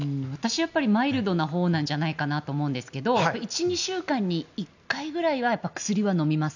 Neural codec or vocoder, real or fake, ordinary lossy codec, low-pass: none; real; none; 7.2 kHz